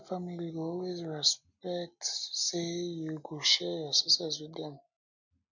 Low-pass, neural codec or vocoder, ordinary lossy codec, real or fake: 7.2 kHz; none; none; real